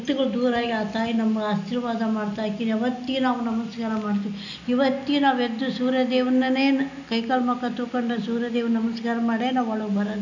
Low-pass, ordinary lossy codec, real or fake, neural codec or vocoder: 7.2 kHz; none; real; none